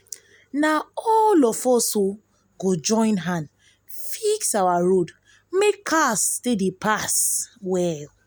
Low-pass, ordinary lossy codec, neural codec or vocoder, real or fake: none; none; none; real